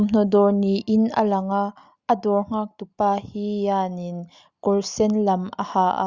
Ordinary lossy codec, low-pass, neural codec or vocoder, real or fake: Opus, 64 kbps; 7.2 kHz; none; real